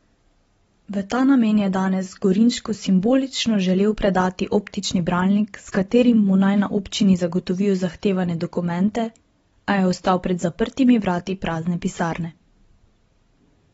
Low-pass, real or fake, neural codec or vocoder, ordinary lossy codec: 19.8 kHz; real; none; AAC, 24 kbps